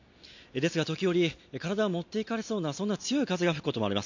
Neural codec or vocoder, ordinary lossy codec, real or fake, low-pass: none; MP3, 48 kbps; real; 7.2 kHz